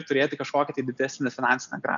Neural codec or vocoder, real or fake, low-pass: none; real; 7.2 kHz